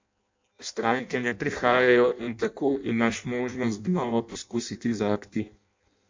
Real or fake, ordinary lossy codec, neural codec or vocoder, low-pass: fake; MP3, 64 kbps; codec, 16 kHz in and 24 kHz out, 0.6 kbps, FireRedTTS-2 codec; 7.2 kHz